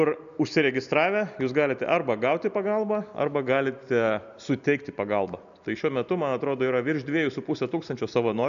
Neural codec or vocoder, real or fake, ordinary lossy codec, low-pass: none; real; MP3, 96 kbps; 7.2 kHz